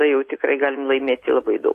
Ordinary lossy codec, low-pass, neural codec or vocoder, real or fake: AAC, 48 kbps; 14.4 kHz; none; real